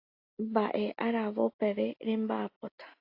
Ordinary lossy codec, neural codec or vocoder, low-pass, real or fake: AAC, 32 kbps; none; 5.4 kHz; real